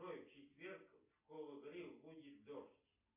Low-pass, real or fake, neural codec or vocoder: 3.6 kHz; real; none